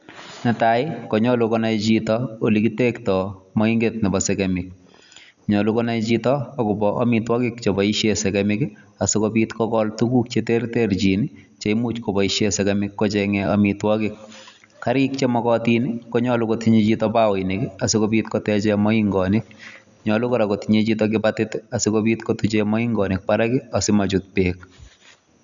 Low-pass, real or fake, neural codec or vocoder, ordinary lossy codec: 7.2 kHz; real; none; none